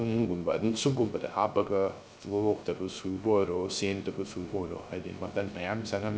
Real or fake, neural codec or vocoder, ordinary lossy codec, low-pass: fake; codec, 16 kHz, 0.3 kbps, FocalCodec; none; none